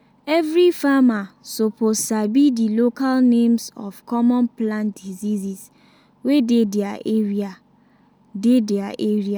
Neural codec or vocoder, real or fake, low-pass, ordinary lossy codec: none; real; none; none